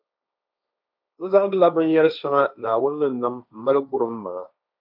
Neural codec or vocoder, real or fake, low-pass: codec, 16 kHz, 1.1 kbps, Voila-Tokenizer; fake; 5.4 kHz